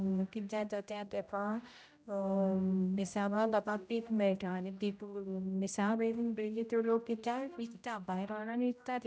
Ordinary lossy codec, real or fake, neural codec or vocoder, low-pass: none; fake; codec, 16 kHz, 0.5 kbps, X-Codec, HuBERT features, trained on general audio; none